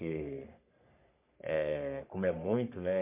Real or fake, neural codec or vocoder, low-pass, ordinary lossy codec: fake; codec, 44.1 kHz, 3.4 kbps, Pupu-Codec; 3.6 kHz; MP3, 24 kbps